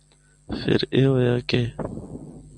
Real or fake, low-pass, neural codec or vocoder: real; 10.8 kHz; none